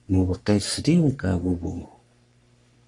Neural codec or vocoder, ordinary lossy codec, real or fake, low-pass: codec, 44.1 kHz, 3.4 kbps, Pupu-Codec; AAC, 64 kbps; fake; 10.8 kHz